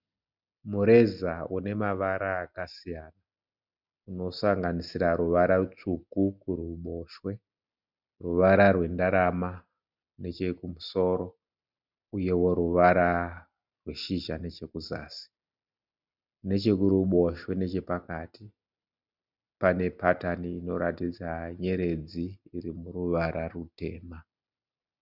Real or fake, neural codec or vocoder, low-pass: real; none; 5.4 kHz